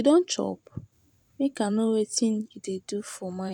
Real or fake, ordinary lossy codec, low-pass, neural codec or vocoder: fake; none; 19.8 kHz; vocoder, 44.1 kHz, 128 mel bands every 512 samples, BigVGAN v2